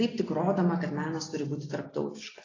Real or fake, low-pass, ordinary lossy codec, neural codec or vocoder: real; 7.2 kHz; AAC, 32 kbps; none